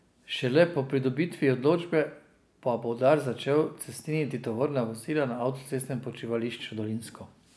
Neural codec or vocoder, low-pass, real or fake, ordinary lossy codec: none; none; real; none